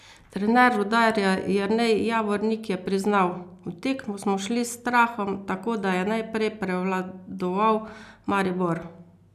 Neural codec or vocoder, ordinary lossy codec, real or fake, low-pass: none; none; real; 14.4 kHz